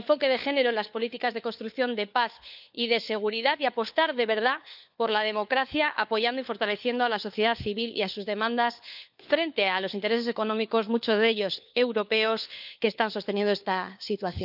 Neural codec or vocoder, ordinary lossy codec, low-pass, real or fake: codec, 16 kHz, 4 kbps, FunCodec, trained on LibriTTS, 50 frames a second; none; 5.4 kHz; fake